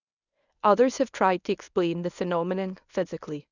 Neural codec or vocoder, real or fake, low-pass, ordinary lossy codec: codec, 24 kHz, 0.9 kbps, WavTokenizer, medium speech release version 1; fake; 7.2 kHz; none